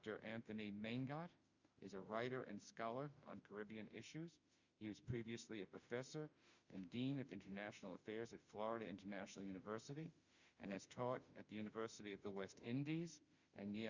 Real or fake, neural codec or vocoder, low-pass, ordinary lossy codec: fake; autoencoder, 48 kHz, 32 numbers a frame, DAC-VAE, trained on Japanese speech; 7.2 kHz; Opus, 64 kbps